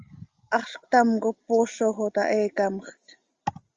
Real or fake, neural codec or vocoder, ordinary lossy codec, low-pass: real; none; Opus, 32 kbps; 7.2 kHz